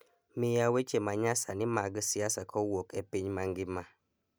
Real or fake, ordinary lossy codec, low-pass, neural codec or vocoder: real; none; none; none